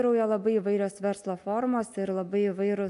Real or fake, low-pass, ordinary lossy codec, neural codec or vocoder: real; 10.8 kHz; AAC, 64 kbps; none